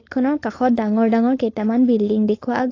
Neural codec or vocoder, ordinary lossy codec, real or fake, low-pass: codec, 16 kHz, 4.8 kbps, FACodec; AAC, 32 kbps; fake; 7.2 kHz